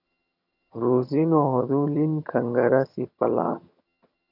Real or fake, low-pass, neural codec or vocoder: fake; 5.4 kHz; vocoder, 22.05 kHz, 80 mel bands, HiFi-GAN